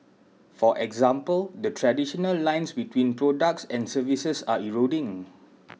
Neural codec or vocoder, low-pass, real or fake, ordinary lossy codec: none; none; real; none